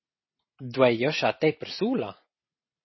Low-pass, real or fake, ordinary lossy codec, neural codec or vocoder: 7.2 kHz; real; MP3, 24 kbps; none